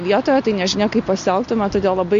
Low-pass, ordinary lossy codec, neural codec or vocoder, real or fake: 7.2 kHz; MP3, 48 kbps; none; real